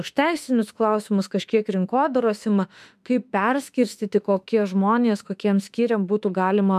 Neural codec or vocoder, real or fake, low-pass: autoencoder, 48 kHz, 32 numbers a frame, DAC-VAE, trained on Japanese speech; fake; 14.4 kHz